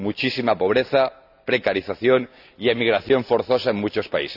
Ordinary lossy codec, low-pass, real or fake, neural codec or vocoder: none; 5.4 kHz; real; none